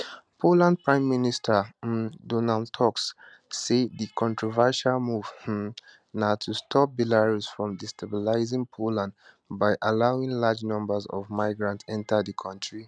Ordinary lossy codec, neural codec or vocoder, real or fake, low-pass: none; none; real; 9.9 kHz